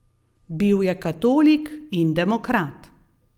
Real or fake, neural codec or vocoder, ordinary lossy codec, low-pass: real; none; Opus, 32 kbps; 19.8 kHz